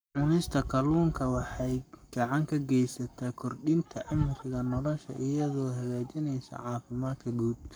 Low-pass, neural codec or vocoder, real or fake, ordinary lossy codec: none; codec, 44.1 kHz, 7.8 kbps, Pupu-Codec; fake; none